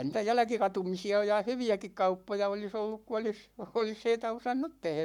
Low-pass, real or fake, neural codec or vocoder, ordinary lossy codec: 19.8 kHz; fake; codec, 44.1 kHz, 7.8 kbps, Pupu-Codec; none